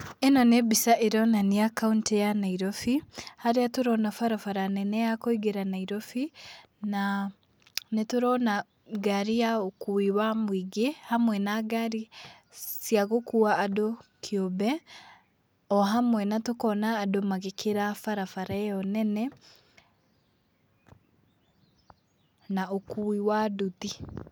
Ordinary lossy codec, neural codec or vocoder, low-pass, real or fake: none; none; none; real